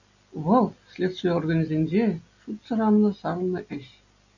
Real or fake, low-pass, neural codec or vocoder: real; 7.2 kHz; none